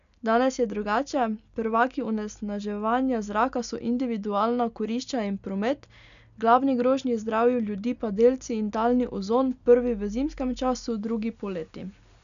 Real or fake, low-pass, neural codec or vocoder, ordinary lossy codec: real; 7.2 kHz; none; none